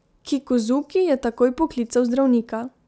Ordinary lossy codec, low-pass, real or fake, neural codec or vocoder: none; none; real; none